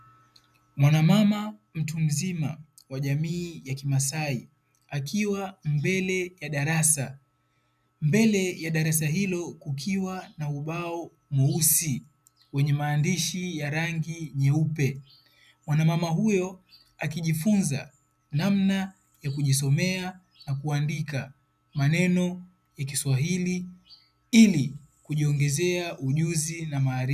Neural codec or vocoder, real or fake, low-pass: none; real; 14.4 kHz